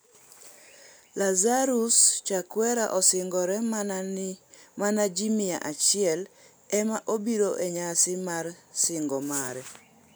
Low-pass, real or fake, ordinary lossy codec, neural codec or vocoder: none; real; none; none